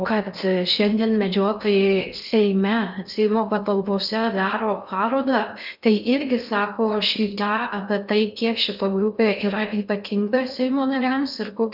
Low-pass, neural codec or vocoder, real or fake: 5.4 kHz; codec, 16 kHz in and 24 kHz out, 0.6 kbps, FocalCodec, streaming, 4096 codes; fake